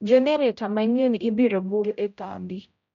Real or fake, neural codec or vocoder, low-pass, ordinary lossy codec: fake; codec, 16 kHz, 0.5 kbps, X-Codec, HuBERT features, trained on general audio; 7.2 kHz; none